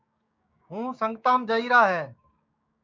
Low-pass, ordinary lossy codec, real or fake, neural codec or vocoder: 7.2 kHz; MP3, 64 kbps; fake; codec, 16 kHz, 6 kbps, DAC